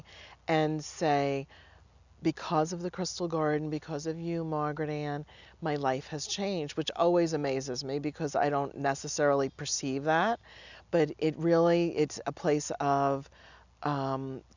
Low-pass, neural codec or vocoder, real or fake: 7.2 kHz; none; real